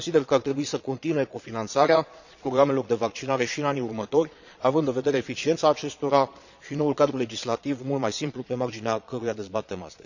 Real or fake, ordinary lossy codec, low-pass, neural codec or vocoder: fake; none; 7.2 kHz; vocoder, 22.05 kHz, 80 mel bands, Vocos